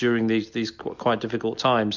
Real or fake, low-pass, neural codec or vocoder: real; 7.2 kHz; none